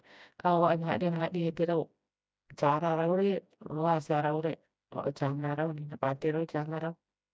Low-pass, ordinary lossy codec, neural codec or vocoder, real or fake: none; none; codec, 16 kHz, 1 kbps, FreqCodec, smaller model; fake